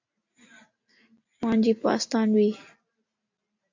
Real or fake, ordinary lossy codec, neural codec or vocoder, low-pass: real; AAC, 48 kbps; none; 7.2 kHz